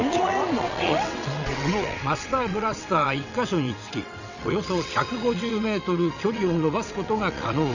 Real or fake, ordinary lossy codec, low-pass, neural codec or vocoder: fake; none; 7.2 kHz; vocoder, 22.05 kHz, 80 mel bands, WaveNeXt